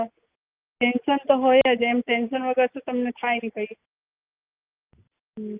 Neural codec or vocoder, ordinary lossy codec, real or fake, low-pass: none; Opus, 24 kbps; real; 3.6 kHz